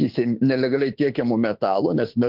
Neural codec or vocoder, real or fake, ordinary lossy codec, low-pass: autoencoder, 48 kHz, 32 numbers a frame, DAC-VAE, trained on Japanese speech; fake; Opus, 16 kbps; 5.4 kHz